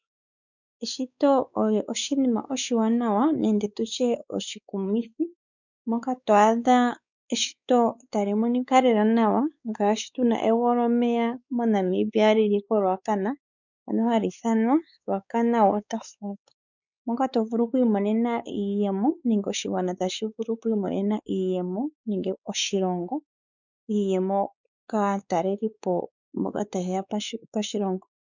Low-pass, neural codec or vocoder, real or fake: 7.2 kHz; codec, 16 kHz, 4 kbps, X-Codec, WavLM features, trained on Multilingual LibriSpeech; fake